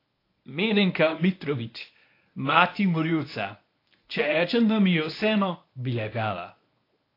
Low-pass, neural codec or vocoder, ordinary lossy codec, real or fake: 5.4 kHz; codec, 24 kHz, 0.9 kbps, WavTokenizer, medium speech release version 1; AAC, 32 kbps; fake